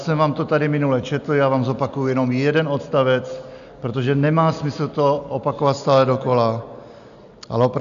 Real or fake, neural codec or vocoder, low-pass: real; none; 7.2 kHz